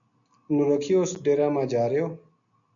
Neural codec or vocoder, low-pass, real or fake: none; 7.2 kHz; real